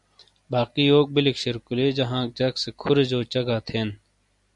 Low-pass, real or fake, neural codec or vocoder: 10.8 kHz; real; none